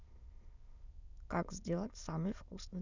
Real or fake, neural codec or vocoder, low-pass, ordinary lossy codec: fake; autoencoder, 22.05 kHz, a latent of 192 numbers a frame, VITS, trained on many speakers; 7.2 kHz; none